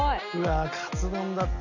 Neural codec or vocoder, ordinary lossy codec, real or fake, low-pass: none; none; real; 7.2 kHz